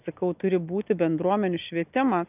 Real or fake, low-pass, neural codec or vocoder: real; 3.6 kHz; none